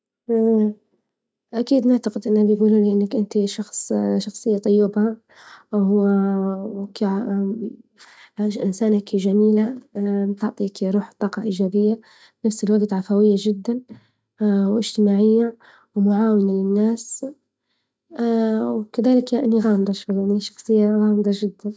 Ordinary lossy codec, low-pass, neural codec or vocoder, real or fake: none; none; none; real